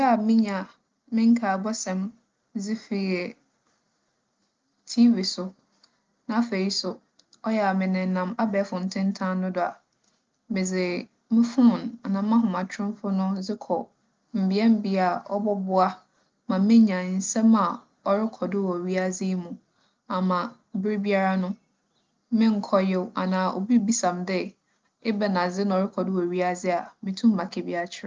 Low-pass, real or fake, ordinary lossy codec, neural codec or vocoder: 7.2 kHz; real; Opus, 32 kbps; none